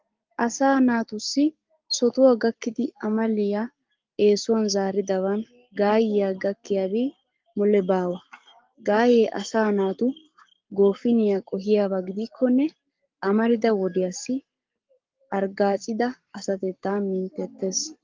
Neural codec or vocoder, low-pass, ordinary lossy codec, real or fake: codec, 44.1 kHz, 7.8 kbps, Pupu-Codec; 7.2 kHz; Opus, 32 kbps; fake